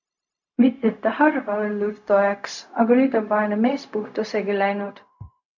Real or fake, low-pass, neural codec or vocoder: fake; 7.2 kHz; codec, 16 kHz, 0.4 kbps, LongCat-Audio-Codec